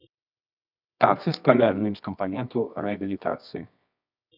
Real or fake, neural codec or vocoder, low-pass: fake; codec, 24 kHz, 0.9 kbps, WavTokenizer, medium music audio release; 5.4 kHz